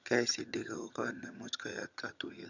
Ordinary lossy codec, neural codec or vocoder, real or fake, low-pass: none; vocoder, 22.05 kHz, 80 mel bands, HiFi-GAN; fake; 7.2 kHz